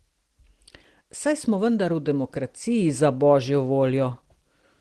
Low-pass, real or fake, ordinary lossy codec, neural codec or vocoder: 10.8 kHz; real; Opus, 16 kbps; none